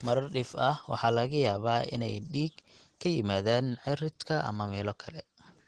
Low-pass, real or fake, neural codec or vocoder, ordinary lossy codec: 9.9 kHz; real; none; Opus, 16 kbps